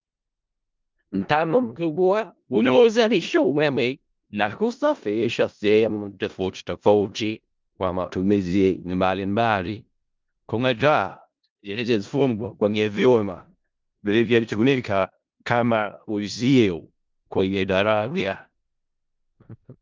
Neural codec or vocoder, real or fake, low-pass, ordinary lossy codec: codec, 16 kHz in and 24 kHz out, 0.4 kbps, LongCat-Audio-Codec, four codebook decoder; fake; 7.2 kHz; Opus, 24 kbps